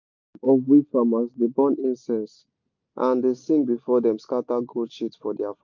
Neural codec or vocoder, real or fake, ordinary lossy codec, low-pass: none; real; MP3, 64 kbps; 7.2 kHz